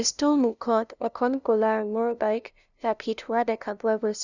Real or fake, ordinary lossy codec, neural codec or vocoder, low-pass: fake; none; codec, 16 kHz, 0.5 kbps, FunCodec, trained on LibriTTS, 25 frames a second; 7.2 kHz